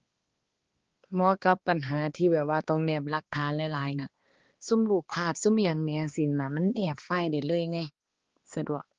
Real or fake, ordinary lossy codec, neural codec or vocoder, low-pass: fake; Opus, 16 kbps; codec, 16 kHz, 2 kbps, X-Codec, HuBERT features, trained on balanced general audio; 7.2 kHz